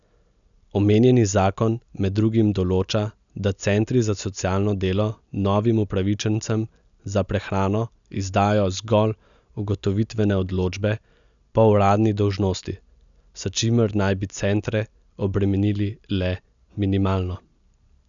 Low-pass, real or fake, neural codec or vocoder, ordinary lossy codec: 7.2 kHz; real; none; none